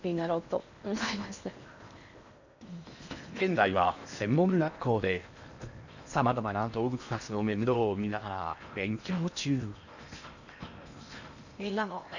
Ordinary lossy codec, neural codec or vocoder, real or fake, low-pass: none; codec, 16 kHz in and 24 kHz out, 0.8 kbps, FocalCodec, streaming, 65536 codes; fake; 7.2 kHz